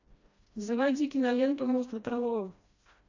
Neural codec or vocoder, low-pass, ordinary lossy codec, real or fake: codec, 16 kHz, 1 kbps, FreqCodec, smaller model; 7.2 kHz; AAC, 48 kbps; fake